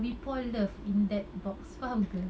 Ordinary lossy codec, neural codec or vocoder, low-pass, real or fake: none; none; none; real